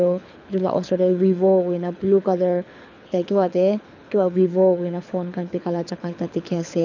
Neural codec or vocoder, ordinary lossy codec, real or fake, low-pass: codec, 24 kHz, 6 kbps, HILCodec; none; fake; 7.2 kHz